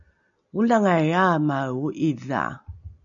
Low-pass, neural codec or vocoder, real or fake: 7.2 kHz; none; real